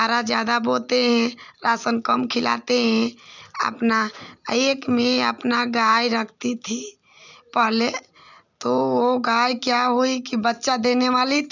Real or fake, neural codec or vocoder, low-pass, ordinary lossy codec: real; none; 7.2 kHz; none